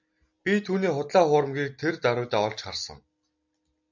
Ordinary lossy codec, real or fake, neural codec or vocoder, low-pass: MP3, 64 kbps; real; none; 7.2 kHz